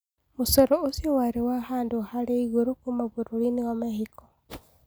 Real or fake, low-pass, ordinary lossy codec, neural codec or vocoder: real; none; none; none